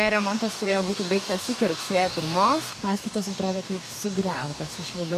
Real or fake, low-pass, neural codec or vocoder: fake; 14.4 kHz; codec, 44.1 kHz, 2.6 kbps, DAC